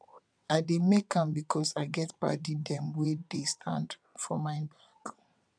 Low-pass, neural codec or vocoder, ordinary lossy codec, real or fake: 9.9 kHz; vocoder, 22.05 kHz, 80 mel bands, WaveNeXt; none; fake